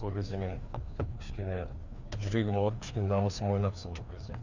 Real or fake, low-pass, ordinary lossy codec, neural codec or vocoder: fake; 7.2 kHz; Opus, 64 kbps; codec, 16 kHz, 2 kbps, FreqCodec, larger model